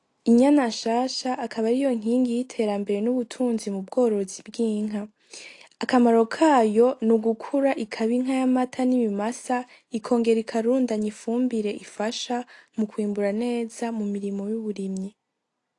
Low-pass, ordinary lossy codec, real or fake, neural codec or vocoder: 10.8 kHz; AAC, 48 kbps; real; none